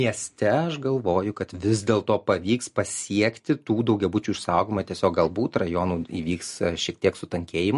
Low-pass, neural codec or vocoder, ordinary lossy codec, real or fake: 14.4 kHz; none; MP3, 48 kbps; real